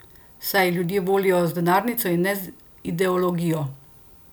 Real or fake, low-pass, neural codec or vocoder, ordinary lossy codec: real; none; none; none